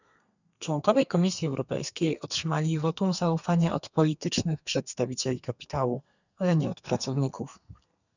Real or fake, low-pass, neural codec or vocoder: fake; 7.2 kHz; codec, 32 kHz, 1.9 kbps, SNAC